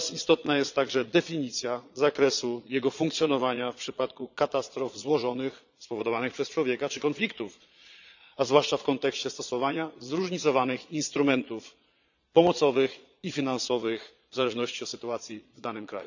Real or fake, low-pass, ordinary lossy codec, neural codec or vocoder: fake; 7.2 kHz; none; vocoder, 22.05 kHz, 80 mel bands, Vocos